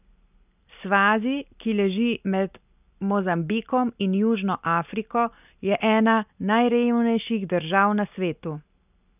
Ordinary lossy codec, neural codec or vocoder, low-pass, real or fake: none; none; 3.6 kHz; real